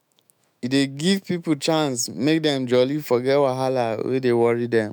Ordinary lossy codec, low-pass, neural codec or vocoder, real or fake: none; none; autoencoder, 48 kHz, 128 numbers a frame, DAC-VAE, trained on Japanese speech; fake